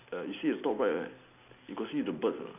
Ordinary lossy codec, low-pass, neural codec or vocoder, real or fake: none; 3.6 kHz; none; real